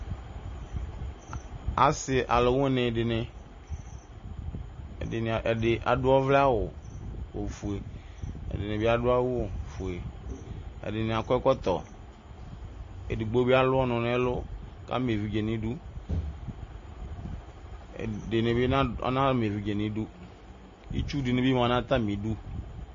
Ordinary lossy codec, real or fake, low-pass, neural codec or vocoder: MP3, 32 kbps; real; 7.2 kHz; none